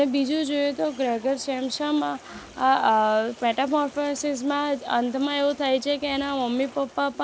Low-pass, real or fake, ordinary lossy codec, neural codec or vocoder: none; real; none; none